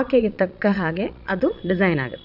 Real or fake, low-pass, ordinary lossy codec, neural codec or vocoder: fake; 5.4 kHz; AAC, 48 kbps; vocoder, 22.05 kHz, 80 mel bands, Vocos